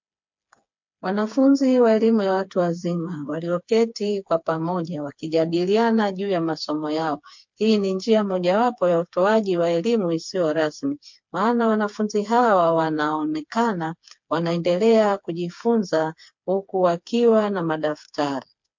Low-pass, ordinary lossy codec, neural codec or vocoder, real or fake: 7.2 kHz; MP3, 48 kbps; codec, 16 kHz, 4 kbps, FreqCodec, smaller model; fake